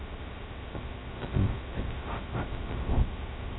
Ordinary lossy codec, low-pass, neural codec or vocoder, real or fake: AAC, 16 kbps; 7.2 kHz; codec, 16 kHz, 0.2 kbps, FocalCodec; fake